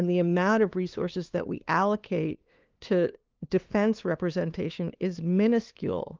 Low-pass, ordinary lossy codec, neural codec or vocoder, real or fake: 7.2 kHz; Opus, 32 kbps; codec, 16 kHz, 2 kbps, FunCodec, trained on LibriTTS, 25 frames a second; fake